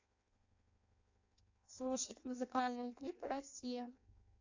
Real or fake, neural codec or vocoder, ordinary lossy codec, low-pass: fake; codec, 16 kHz in and 24 kHz out, 0.6 kbps, FireRedTTS-2 codec; AAC, 48 kbps; 7.2 kHz